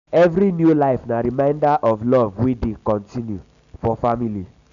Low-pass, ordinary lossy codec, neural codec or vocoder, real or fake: 7.2 kHz; none; none; real